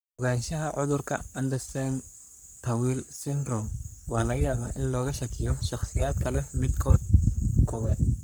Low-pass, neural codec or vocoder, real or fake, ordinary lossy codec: none; codec, 44.1 kHz, 3.4 kbps, Pupu-Codec; fake; none